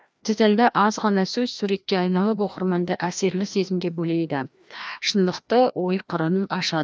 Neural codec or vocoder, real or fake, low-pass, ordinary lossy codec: codec, 16 kHz, 1 kbps, FreqCodec, larger model; fake; none; none